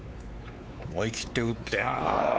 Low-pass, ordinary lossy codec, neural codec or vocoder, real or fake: none; none; codec, 16 kHz, 4 kbps, X-Codec, WavLM features, trained on Multilingual LibriSpeech; fake